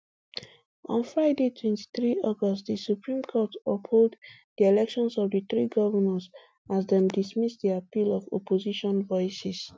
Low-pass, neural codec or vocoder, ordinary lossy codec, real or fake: none; none; none; real